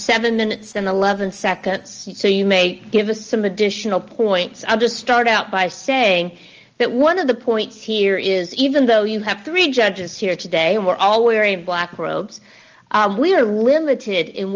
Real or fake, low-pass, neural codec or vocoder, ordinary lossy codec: real; 7.2 kHz; none; Opus, 24 kbps